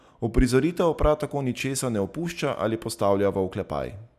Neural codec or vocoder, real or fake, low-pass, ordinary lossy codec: none; real; 14.4 kHz; none